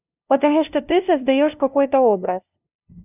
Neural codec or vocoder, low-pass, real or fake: codec, 16 kHz, 0.5 kbps, FunCodec, trained on LibriTTS, 25 frames a second; 3.6 kHz; fake